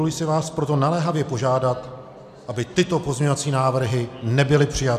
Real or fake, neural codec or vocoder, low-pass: real; none; 14.4 kHz